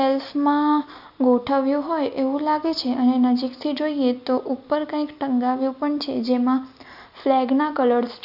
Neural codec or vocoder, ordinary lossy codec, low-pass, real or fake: none; none; 5.4 kHz; real